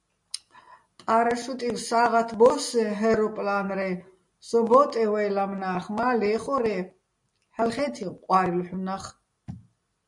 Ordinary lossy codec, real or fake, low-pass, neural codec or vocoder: MP3, 48 kbps; real; 10.8 kHz; none